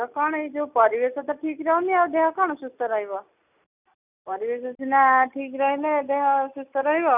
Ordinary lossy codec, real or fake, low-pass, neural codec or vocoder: none; real; 3.6 kHz; none